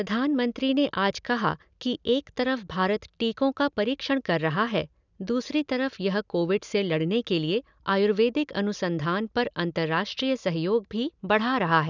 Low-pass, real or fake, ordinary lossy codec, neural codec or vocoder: 7.2 kHz; real; none; none